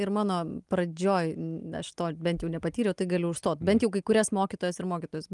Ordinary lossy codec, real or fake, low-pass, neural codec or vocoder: Opus, 32 kbps; real; 10.8 kHz; none